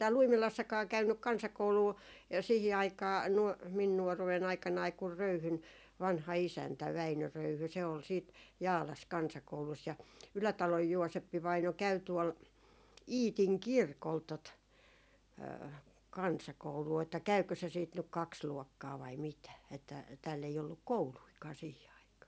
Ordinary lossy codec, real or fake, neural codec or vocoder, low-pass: none; real; none; none